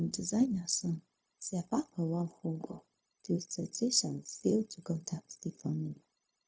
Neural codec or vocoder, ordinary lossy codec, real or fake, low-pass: codec, 16 kHz, 0.4 kbps, LongCat-Audio-Codec; none; fake; none